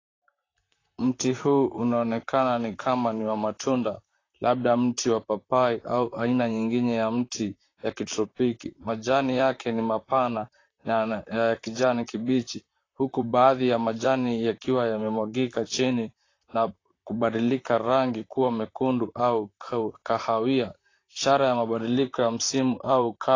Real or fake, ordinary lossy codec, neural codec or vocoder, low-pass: fake; AAC, 32 kbps; vocoder, 44.1 kHz, 128 mel bands, Pupu-Vocoder; 7.2 kHz